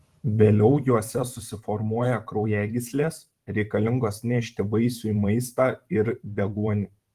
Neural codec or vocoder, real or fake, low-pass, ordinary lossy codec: vocoder, 44.1 kHz, 128 mel bands every 512 samples, BigVGAN v2; fake; 14.4 kHz; Opus, 24 kbps